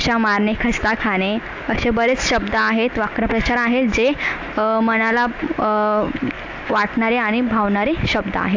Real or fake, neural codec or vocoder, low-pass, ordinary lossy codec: real; none; 7.2 kHz; AAC, 48 kbps